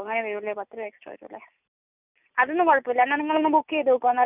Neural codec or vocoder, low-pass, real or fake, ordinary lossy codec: none; 3.6 kHz; real; none